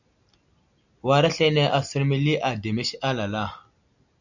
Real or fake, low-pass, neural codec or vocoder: real; 7.2 kHz; none